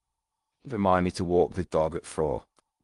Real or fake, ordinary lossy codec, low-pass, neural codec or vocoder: fake; Opus, 32 kbps; 10.8 kHz; codec, 16 kHz in and 24 kHz out, 0.6 kbps, FocalCodec, streaming, 4096 codes